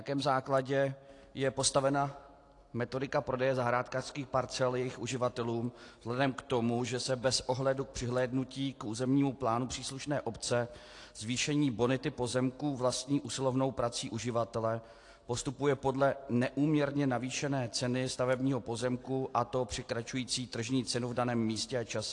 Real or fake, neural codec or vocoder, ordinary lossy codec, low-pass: real; none; AAC, 48 kbps; 10.8 kHz